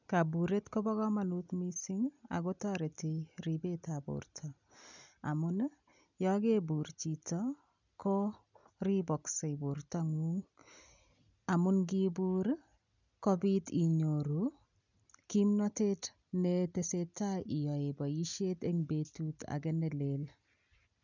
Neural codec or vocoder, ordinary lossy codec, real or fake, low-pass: none; none; real; 7.2 kHz